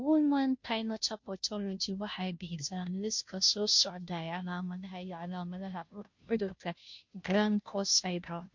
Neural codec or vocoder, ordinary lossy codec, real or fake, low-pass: codec, 16 kHz, 0.5 kbps, FunCodec, trained on Chinese and English, 25 frames a second; MP3, 48 kbps; fake; 7.2 kHz